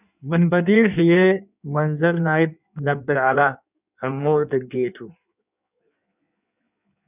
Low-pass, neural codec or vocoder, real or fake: 3.6 kHz; codec, 16 kHz in and 24 kHz out, 1.1 kbps, FireRedTTS-2 codec; fake